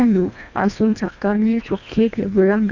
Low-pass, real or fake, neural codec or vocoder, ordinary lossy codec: 7.2 kHz; fake; codec, 24 kHz, 1.5 kbps, HILCodec; none